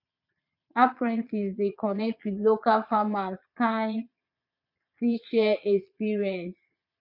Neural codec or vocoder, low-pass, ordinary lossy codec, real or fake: vocoder, 22.05 kHz, 80 mel bands, Vocos; 5.4 kHz; MP3, 48 kbps; fake